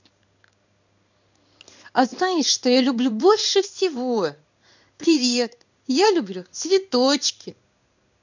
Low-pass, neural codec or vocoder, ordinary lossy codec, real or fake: 7.2 kHz; codec, 16 kHz in and 24 kHz out, 1 kbps, XY-Tokenizer; none; fake